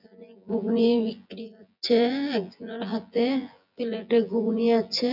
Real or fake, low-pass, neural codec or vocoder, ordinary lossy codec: fake; 5.4 kHz; vocoder, 24 kHz, 100 mel bands, Vocos; none